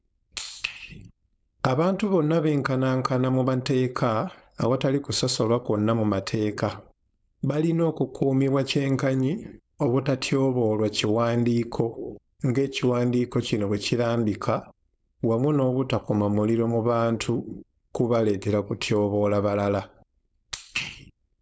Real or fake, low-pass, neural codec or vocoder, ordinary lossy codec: fake; none; codec, 16 kHz, 4.8 kbps, FACodec; none